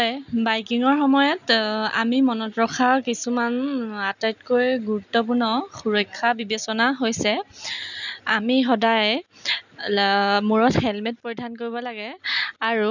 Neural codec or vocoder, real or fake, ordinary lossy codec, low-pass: none; real; none; 7.2 kHz